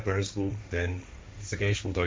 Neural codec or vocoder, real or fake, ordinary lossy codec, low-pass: codec, 16 kHz, 1.1 kbps, Voila-Tokenizer; fake; none; none